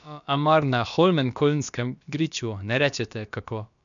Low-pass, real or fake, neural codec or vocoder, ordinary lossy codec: 7.2 kHz; fake; codec, 16 kHz, about 1 kbps, DyCAST, with the encoder's durations; none